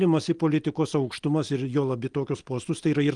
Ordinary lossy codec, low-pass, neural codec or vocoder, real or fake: Opus, 24 kbps; 9.9 kHz; none; real